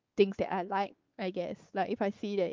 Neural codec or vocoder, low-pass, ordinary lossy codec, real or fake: codec, 16 kHz, 4 kbps, X-Codec, WavLM features, trained on Multilingual LibriSpeech; 7.2 kHz; Opus, 24 kbps; fake